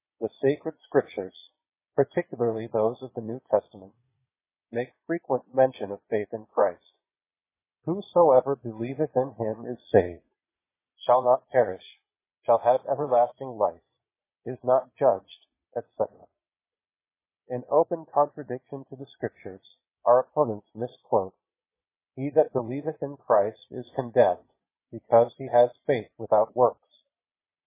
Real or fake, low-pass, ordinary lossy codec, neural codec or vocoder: fake; 3.6 kHz; MP3, 16 kbps; vocoder, 22.05 kHz, 80 mel bands, Vocos